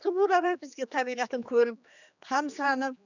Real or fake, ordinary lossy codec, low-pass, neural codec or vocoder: fake; none; 7.2 kHz; codec, 16 kHz, 2 kbps, X-Codec, HuBERT features, trained on general audio